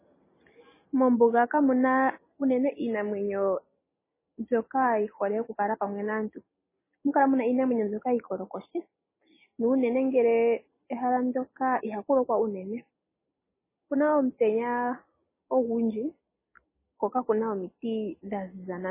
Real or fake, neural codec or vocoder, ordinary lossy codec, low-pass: real; none; MP3, 16 kbps; 3.6 kHz